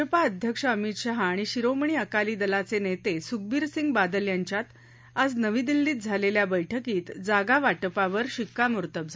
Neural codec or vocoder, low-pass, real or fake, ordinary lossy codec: none; none; real; none